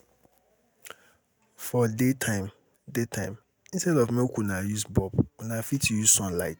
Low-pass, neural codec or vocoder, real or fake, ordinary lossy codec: none; none; real; none